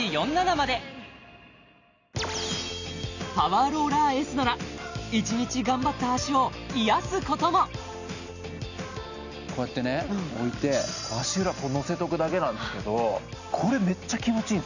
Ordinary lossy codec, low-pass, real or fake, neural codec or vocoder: none; 7.2 kHz; real; none